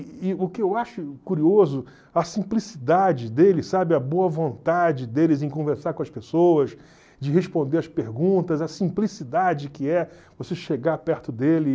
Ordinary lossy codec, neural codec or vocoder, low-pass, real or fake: none; none; none; real